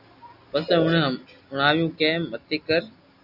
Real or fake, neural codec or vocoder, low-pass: real; none; 5.4 kHz